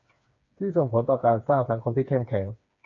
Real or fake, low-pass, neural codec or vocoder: fake; 7.2 kHz; codec, 16 kHz, 4 kbps, FreqCodec, smaller model